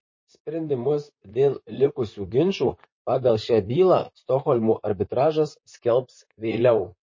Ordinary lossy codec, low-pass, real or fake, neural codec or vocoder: MP3, 32 kbps; 7.2 kHz; fake; vocoder, 44.1 kHz, 128 mel bands, Pupu-Vocoder